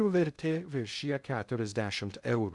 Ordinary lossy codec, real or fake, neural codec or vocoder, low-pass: MP3, 96 kbps; fake; codec, 16 kHz in and 24 kHz out, 0.6 kbps, FocalCodec, streaming, 2048 codes; 10.8 kHz